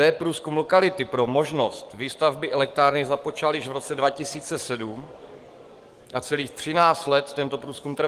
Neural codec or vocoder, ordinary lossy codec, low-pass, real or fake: codec, 44.1 kHz, 7.8 kbps, DAC; Opus, 24 kbps; 14.4 kHz; fake